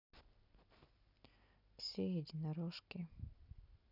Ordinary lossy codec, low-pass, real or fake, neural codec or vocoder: none; 5.4 kHz; real; none